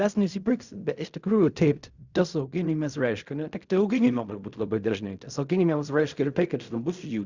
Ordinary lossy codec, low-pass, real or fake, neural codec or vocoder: Opus, 64 kbps; 7.2 kHz; fake; codec, 16 kHz in and 24 kHz out, 0.4 kbps, LongCat-Audio-Codec, fine tuned four codebook decoder